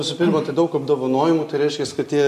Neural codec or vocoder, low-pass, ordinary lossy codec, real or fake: none; 14.4 kHz; MP3, 64 kbps; real